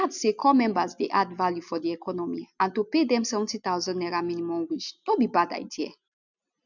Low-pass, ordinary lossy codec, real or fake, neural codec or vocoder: 7.2 kHz; none; real; none